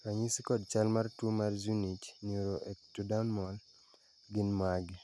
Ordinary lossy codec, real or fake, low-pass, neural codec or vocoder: none; real; none; none